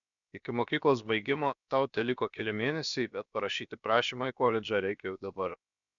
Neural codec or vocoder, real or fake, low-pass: codec, 16 kHz, about 1 kbps, DyCAST, with the encoder's durations; fake; 7.2 kHz